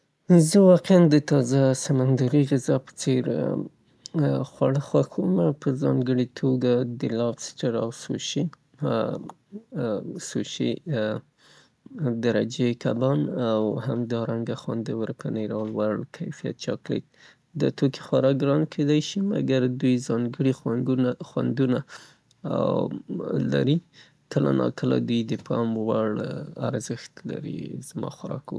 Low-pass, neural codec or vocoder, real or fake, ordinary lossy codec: none; none; real; none